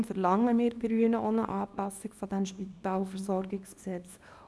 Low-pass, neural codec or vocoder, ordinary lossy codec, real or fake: none; codec, 24 kHz, 0.9 kbps, WavTokenizer, small release; none; fake